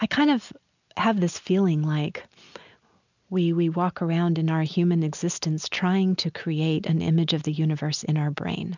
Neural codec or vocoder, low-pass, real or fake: none; 7.2 kHz; real